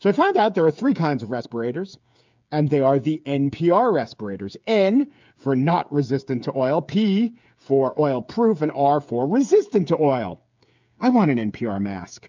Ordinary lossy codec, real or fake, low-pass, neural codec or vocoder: MP3, 64 kbps; fake; 7.2 kHz; codec, 16 kHz, 8 kbps, FreqCodec, smaller model